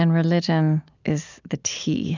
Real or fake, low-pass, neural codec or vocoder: real; 7.2 kHz; none